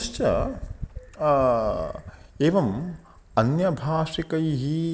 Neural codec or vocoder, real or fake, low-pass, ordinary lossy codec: none; real; none; none